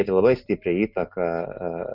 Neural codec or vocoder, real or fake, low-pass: none; real; 5.4 kHz